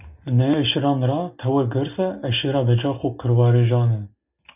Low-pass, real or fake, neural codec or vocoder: 3.6 kHz; real; none